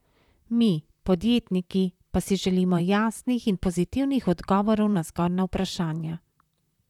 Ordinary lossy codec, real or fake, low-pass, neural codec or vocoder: none; fake; 19.8 kHz; vocoder, 44.1 kHz, 128 mel bands, Pupu-Vocoder